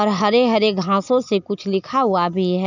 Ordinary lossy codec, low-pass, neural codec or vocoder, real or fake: none; 7.2 kHz; none; real